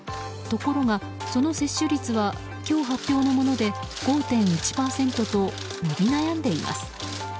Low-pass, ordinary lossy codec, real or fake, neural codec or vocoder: none; none; real; none